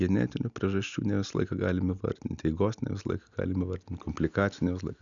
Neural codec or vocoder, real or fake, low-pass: none; real; 7.2 kHz